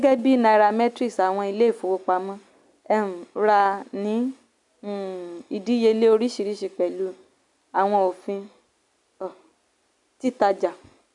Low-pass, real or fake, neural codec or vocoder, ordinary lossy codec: 10.8 kHz; real; none; none